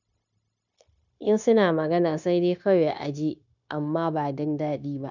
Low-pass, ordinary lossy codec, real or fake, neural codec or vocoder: 7.2 kHz; none; fake; codec, 16 kHz, 0.9 kbps, LongCat-Audio-Codec